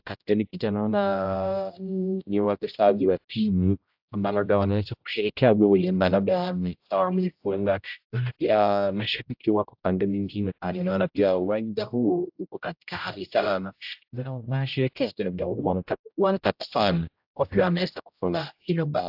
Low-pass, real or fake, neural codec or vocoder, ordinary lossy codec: 5.4 kHz; fake; codec, 16 kHz, 0.5 kbps, X-Codec, HuBERT features, trained on general audio; AAC, 48 kbps